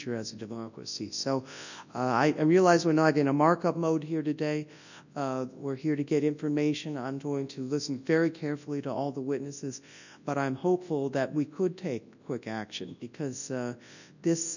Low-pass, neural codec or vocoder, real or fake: 7.2 kHz; codec, 24 kHz, 0.9 kbps, WavTokenizer, large speech release; fake